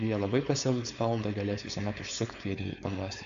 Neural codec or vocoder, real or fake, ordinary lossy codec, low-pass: codec, 16 kHz, 4.8 kbps, FACodec; fake; AAC, 64 kbps; 7.2 kHz